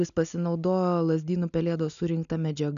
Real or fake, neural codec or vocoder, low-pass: real; none; 7.2 kHz